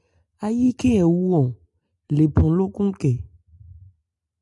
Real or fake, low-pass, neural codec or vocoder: real; 10.8 kHz; none